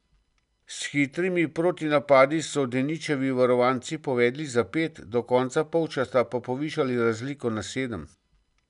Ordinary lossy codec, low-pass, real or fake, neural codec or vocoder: none; 10.8 kHz; real; none